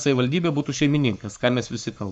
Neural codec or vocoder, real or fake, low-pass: codec, 44.1 kHz, 7.8 kbps, Pupu-Codec; fake; 10.8 kHz